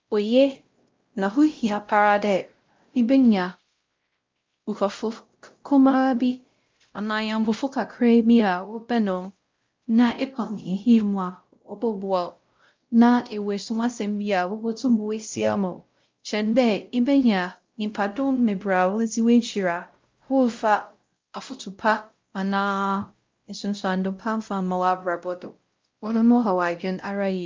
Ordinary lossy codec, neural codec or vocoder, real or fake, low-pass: Opus, 24 kbps; codec, 16 kHz, 0.5 kbps, X-Codec, WavLM features, trained on Multilingual LibriSpeech; fake; 7.2 kHz